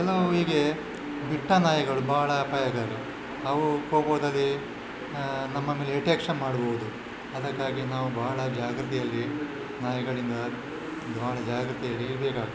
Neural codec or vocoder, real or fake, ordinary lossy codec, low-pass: none; real; none; none